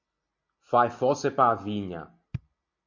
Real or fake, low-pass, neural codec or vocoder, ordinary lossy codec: real; 7.2 kHz; none; MP3, 48 kbps